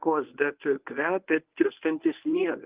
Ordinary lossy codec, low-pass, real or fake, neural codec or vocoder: Opus, 32 kbps; 3.6 kHz; fake; codec, 24 kHz, 0.9 kbps, WavTokenizer, medium speech release version 1